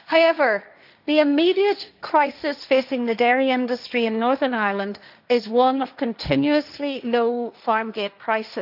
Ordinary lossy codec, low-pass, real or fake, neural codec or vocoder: none; 5.4 kHz; fake; codec, 16 kHz, 1.1 kbps, Voila-Tokenizer